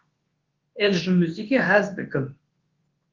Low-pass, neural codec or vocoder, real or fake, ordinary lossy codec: 7.2 kHz; codec, 24 kHz, 0.9 kbps, WavTokenizer, large speech release; fake; Opus, 16 kbps